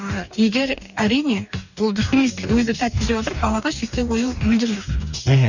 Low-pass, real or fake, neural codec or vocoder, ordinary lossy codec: 7.2 kHz; fake; codec, 44.1 kHz, 2.6 kbps, DAC; none